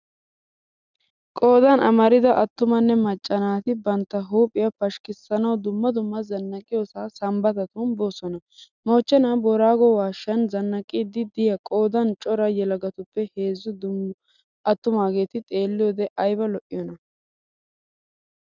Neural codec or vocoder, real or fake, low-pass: none; real; 7.2 kHz